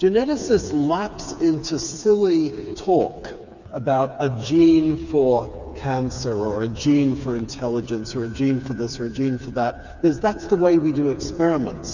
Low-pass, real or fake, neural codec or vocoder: 7.2 kHz; fake; codec, 16 kHz, 4 kbps, FreqCodec, smaller model